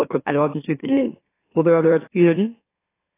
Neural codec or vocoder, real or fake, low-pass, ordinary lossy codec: autoencoder, 44.1 kHz, a latent of 192 numbers a frame, MeloTTS; fake; 3.6 kHz; AAC, 16 kbps